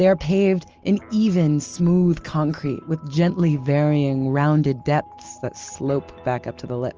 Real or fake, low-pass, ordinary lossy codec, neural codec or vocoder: real; 7.2 kHz; Opus, 24 kbps; none